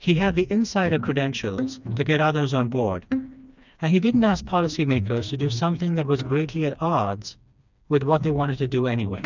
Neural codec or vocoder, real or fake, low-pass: codec, 16 kHz, 2 kbps, FreqCodec, smaller model; fake; 7.2 kHz